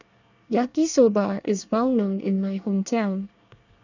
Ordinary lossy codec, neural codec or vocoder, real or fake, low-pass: none; codec, 24 kHz, 1 kbps, SNAC; fake; 7.2 kHz